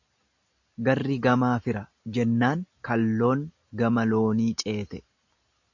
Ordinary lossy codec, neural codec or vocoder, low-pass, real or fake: AAC, 48 kbps; none; 7.2 kHz; real